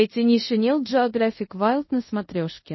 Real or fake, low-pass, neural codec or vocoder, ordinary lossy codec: fake; 7.2 kHz; codec, 24 kHz, 0.5 kbps, DualCodec; MP3, 24 kbps